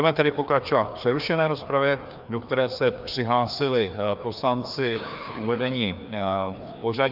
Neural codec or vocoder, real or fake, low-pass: codec, 16 kHz, 2 kbps, FreqCodec, larger model; fake; 5.4 kHz